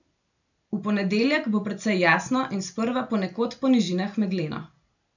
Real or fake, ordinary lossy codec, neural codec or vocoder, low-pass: real; none; none; 7.2 kHz